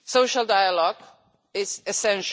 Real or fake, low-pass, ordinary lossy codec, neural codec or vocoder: real; none; none; none